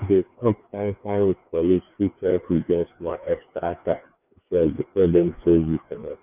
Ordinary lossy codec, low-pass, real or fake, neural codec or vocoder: none; 3.6 kHz; fake; codec, 16 kHz, 2 kbps, FreqCodec, larger model